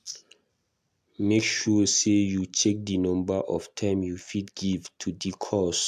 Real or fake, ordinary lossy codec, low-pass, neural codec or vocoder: real; AAC, 96 kbps; 14.4 kHz; none